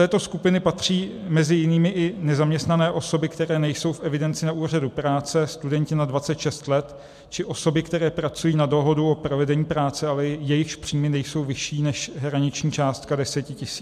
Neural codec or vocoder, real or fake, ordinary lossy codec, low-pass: none; real; MP3, 96 kbps; 14.4 kHz